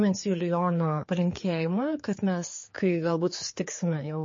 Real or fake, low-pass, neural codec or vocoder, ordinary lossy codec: fake; 7.2 kHz; codec, 16 kHz, 4 kbps, X-Codec, HuBERT features, trained on general audio; MP3, 32 kbps